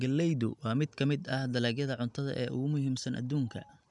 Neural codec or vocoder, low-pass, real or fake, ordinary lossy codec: none; 10.8 kHz; real; none